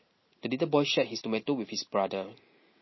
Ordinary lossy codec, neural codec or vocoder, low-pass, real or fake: MP3, 24 kbps; none; 7.2 kHz; real